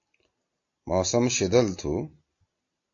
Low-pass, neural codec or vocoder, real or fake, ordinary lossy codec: 7.2 kHz; none; real; AAC, 48 kbps